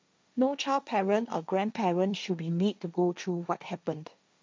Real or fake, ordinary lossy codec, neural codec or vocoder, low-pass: fake; none; codec, 16 kHz, 1.1 kbps, Voila-Tokenizer; none